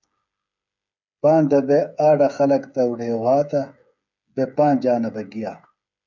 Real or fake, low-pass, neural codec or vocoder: fake; 7.2 kHz; codec, 16 kHz, 8 kbps, FreqCodec, smaller model